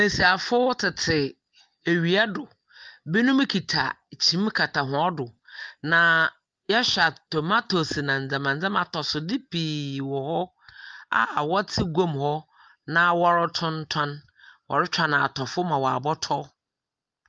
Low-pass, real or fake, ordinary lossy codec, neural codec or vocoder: 7.2 kHz; real; Opus, 24 kbps; none